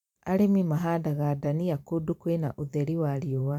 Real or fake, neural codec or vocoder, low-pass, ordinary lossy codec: fake; vocoder, 44.1 kHz, 128 mel bands every 512 samples, BigVGAN v2; 19.8 kHz; none